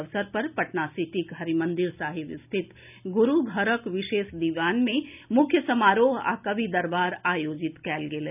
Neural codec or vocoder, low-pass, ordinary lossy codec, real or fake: none; 3.6 kHz; none; real